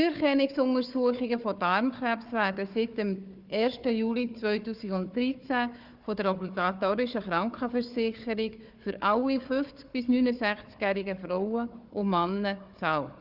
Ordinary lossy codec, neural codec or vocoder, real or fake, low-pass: Opus, 64 kbps; codec, 16 kHz, 4 kbps, FunCodec, trained on Chinese and English, 50 frames a second; fake; 5.4 kHz